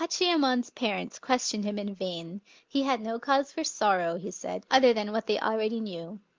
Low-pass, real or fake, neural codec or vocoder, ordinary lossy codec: 7.2 kHz; real; none; Opus, 32 kbps